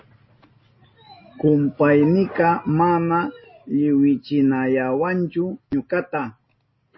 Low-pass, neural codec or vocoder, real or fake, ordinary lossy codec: 7.2 kHz; none; real; MP3, 24 kbps